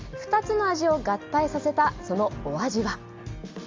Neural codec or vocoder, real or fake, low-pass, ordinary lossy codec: none; real; 7.2 kHz; Opus, 32 kbps